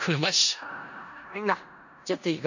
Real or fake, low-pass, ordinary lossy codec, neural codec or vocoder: fake; 7.2 kHz; none; codec, 16 kHz in and 24 kHz out, 0.4 kbps, LongCat-Audio-Codec, four codebook decoder